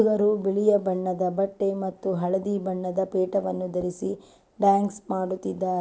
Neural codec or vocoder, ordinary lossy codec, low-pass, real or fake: none; none; none; real